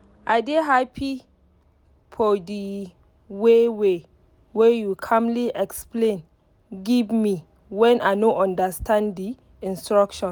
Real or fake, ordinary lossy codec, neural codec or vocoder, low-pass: real; none; none; none